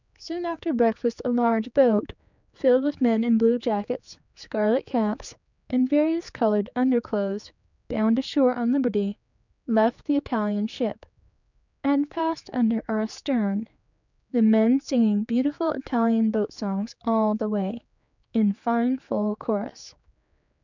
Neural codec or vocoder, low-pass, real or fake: codec, 16 kHz, 4 kbps, X-Codec, HuBERT features, trained on general audio; 7.2 kHz; fake